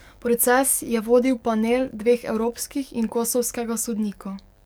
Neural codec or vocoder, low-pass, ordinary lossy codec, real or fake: codec, 44.1 kHz, 7.8 kbps, DAC; none; none; fake